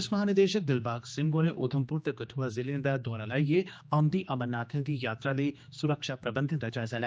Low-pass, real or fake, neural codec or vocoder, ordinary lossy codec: none; fake; codec, 16 kHz, 2 kbps, X-Codec, HuBERT features, trained on general audio; none